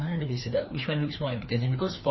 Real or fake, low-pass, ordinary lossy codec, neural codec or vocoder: fake; 7.2 kHz; MP3, 24 kbps; codec, 16 kHz, 2 kbps, FreqCodec, larger model